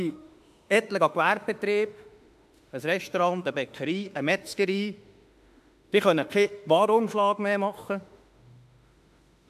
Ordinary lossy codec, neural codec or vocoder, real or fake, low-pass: AAC, 96 kbps; autoencoder, 48 kHz, 32 numbers a frame, DAC-VAE, trained on Japanese speech; fake; 14.4 kHz